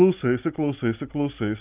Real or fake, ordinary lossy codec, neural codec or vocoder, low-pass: real; Opus, 32 kbps; none; 3.6 kHz